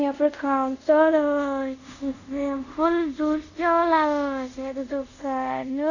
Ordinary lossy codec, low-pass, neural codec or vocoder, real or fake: none; 7.2 kHz; codec, 24 kHz, 0.5 kbps, DualCodec; fake